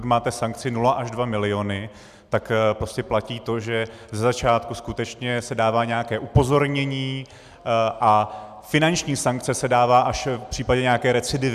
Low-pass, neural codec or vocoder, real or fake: 14.4 kHz; none; real